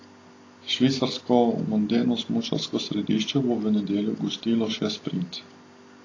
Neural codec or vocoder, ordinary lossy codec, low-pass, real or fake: none; AAC, 32 kbps; 7.2 kHz; real